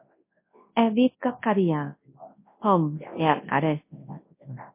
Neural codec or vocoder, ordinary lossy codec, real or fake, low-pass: codec, 24 kHz, 0.9 kbps, WavTokenizer, large speech release; MP3, 24 kbps; fake; 3.6 kHz